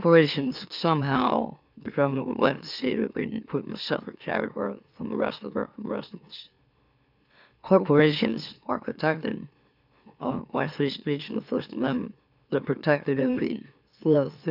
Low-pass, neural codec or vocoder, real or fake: 5.4 kHz; autoencoder, 44.1 kHz, a latent of 192 numbers a frame, MeloTTS; fake